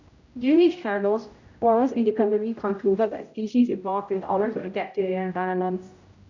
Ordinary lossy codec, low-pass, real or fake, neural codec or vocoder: none; 7.2 kHz; fake; codec, 16 kHz, 0.5 kbps, X-Codec, HuBERT features, trained on general audio